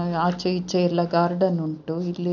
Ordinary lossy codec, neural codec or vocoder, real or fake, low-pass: none; none; real; 7.2 kHz